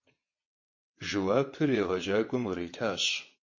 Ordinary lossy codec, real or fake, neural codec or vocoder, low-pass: MP3, 32 kbps; fake; vocoder, 22.05 kHz, 80 mel bands, WaveNeXt; 7.2 kHz